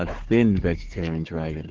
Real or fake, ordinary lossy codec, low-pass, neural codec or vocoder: fake; Opus, 16 kbps; 7.2 kHz; codec, 16 kHz, 4 kbps, FunCodec, trained on Chinese and English, 50 frames a second